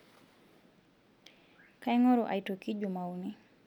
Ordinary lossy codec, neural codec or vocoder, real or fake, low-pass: none; none; real; 19.8 kHz